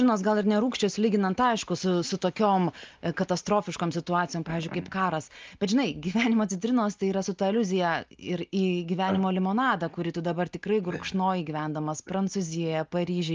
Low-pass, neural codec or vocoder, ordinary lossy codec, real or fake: 7.2 kHz; none; Opus, 24 kbps; real